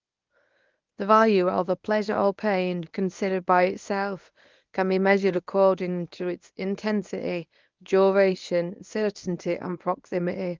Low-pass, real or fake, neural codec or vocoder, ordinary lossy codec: 7.2 kHz; fake; codec, 24 kHz, 0.9 kbps, WavTokenizer, medium speech release version 1; Opus, 24 kbps